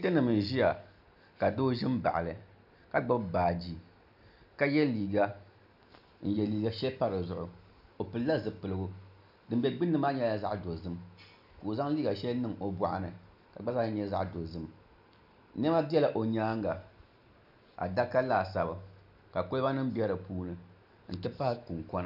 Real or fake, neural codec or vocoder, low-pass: real; none; 5.4 kHz